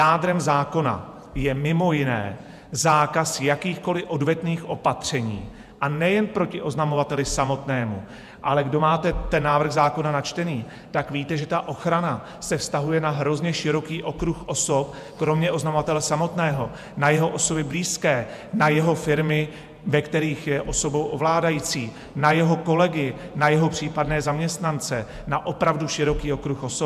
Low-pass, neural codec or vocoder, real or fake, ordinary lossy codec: 14.4 kHz; vocoder, 48 kHz, 128 mel bands, Vocos; fake; MP3, 96 kbps